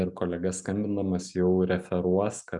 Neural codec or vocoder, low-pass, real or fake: none; 10.8 kHz; real